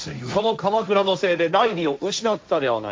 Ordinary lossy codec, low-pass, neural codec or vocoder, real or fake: none; none; codec, 16 kHz, 1.1 kbps, Voila-Tokenizer; fake